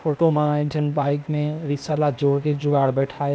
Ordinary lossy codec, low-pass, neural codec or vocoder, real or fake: none; none; codec, 16 kHz, 0.8 kbps, ZipCodec; fake